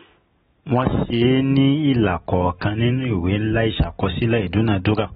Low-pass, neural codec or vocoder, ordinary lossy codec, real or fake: 19.8 kHz; none; AAC, 16 kbps; real